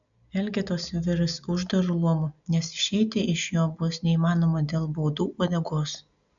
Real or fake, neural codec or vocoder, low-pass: real; none; 7.2 kHz